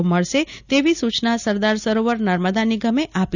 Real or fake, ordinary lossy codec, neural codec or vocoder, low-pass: real; none; none; 7.2 kHz